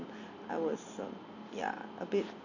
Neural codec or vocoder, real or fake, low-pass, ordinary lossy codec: none; real; 7.2 kHz; none